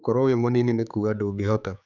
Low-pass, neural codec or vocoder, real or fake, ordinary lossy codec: 7.2 kHz; codec, 16 kHz, 4 kbps, X-Codec, HuBERT features, trained on general audio; fake; none